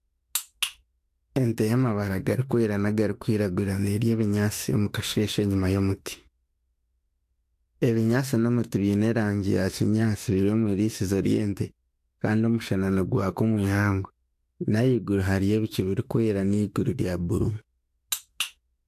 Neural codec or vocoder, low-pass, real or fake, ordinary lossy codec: autoencoder, 48 kHz, 32 numbers a frame, DAC-VAE, trained on Japanese speech; 14.4 kHz; fake; AAC, 64 kbps